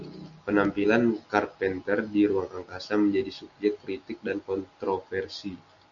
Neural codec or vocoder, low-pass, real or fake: none; 7.2 kHz; real